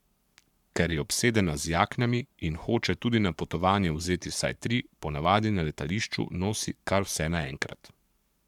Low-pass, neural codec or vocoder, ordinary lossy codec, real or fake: 19.8 kHz; codec, 44.1 kHz, 7.8 kbps, Pupu-Codec; none; fake